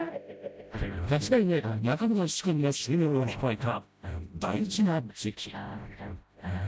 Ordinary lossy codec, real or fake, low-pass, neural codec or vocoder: none; fake; none; codec, 16 kHz, 0.5 kbps, FreqCodec, smaller model